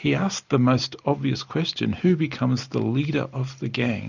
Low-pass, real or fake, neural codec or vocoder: 7.2 kHz; real; none